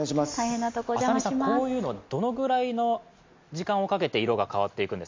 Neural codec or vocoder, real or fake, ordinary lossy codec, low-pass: none; real; MP3, 64 kbps; 7.2 kHz